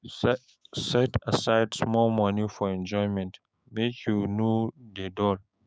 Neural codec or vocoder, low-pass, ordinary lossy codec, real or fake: codec, 16 kHz, 6 kbps, DAC; none; none; fake